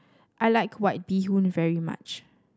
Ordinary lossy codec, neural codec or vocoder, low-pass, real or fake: none; none; none; real